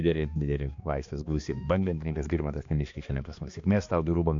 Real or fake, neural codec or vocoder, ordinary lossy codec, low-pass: fake; codec, 16 kHz, 2 kbps, X-Codec, HuBERT features, trained on balanced general audio; MP3, 48 kbps; 7.2 kHz